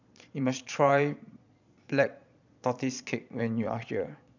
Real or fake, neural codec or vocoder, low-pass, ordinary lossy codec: fake; vocoder, 22.05 kHz, 80 mel bands, Vocos; 7.2 kHz; none